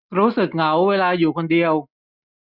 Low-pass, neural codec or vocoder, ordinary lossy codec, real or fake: 5.4 kHz; none; none; real